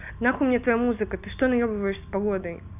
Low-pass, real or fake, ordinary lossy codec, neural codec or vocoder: 3.6 kHz; real; none; none